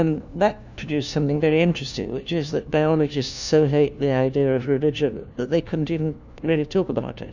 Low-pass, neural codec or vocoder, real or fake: 7.2 kHz; codec, 16 kHz, 1 kbps, FunCodec, trained on LibriTTS, 50 frames a second; fake